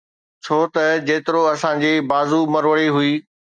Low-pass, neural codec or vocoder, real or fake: 9.9 kHz; none; real